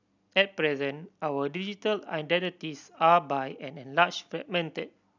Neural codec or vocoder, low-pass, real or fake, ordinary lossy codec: none; 7.2 kHz; real; none